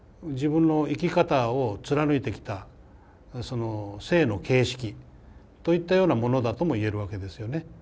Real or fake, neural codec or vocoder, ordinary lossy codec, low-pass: real; none; none; none